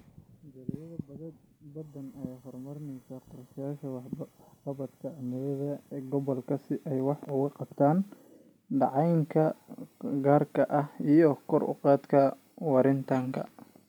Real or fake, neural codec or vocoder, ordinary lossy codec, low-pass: real; none; none; none